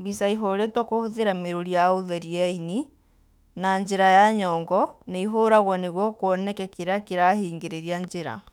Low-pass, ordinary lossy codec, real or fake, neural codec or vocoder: 19.8 kHz; none; fake; autoencoder, 48 kHz, 32 numbers a frame, DAC-VAE, trained on Japanese speech